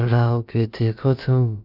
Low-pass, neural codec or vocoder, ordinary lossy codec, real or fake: 5.4 kHz; codec, 16 kHz, about 1 kbps, DyCAST, with the encoder's durations; none; fake